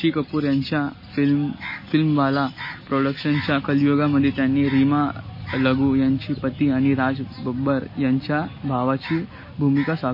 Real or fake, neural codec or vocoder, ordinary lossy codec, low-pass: real; none; MP3, 24 kbps; 5.4 kHz